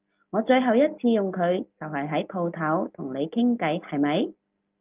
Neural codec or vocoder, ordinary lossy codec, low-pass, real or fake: none; Opus, 24 kbps; 3.6 kHz; real